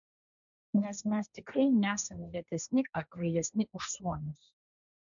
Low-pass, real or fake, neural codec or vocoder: 7.2 kHz; fake; codec, 16 kHz, 1.1 kbps, Voila-Tokenizer